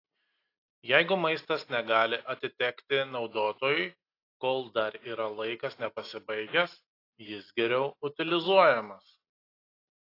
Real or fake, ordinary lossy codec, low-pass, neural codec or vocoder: real; AAC, 32 kbps; 5.4 kHz; none